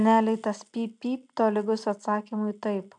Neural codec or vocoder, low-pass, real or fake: none; 10.8 kHz; real